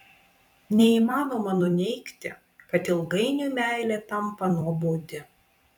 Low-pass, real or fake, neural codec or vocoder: 19.8 kHz; fake; vocoder, 44.1 kHz, 128 mel bands every 256 samples, BigVGAN v2